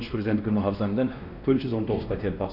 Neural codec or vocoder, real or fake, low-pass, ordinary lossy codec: codec, 16 kHz, 1 kbps, X-Codec, WavLM features, trained on Multilingual LibriSpeech; fake; 5.4 kHz; none